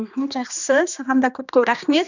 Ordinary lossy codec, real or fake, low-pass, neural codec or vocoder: none; fake; 7.2 kHz; codec, 16 kHz, 2 kbps, X-Codec, HuBERT features, trained on general audio